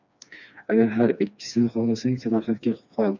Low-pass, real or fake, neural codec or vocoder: 7.2 kHz; fake; codec, 16 kHz, 2 kbps, FreqCodec, smaller model